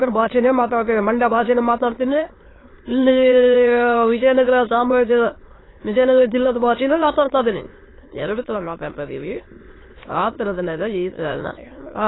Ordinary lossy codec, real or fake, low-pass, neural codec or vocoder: AAC, 16 kbps; fake; 7.2 kHz; autoencoder, 22.05 kHz, a latent of 192 numbers a frame, VITS, trained on many speakers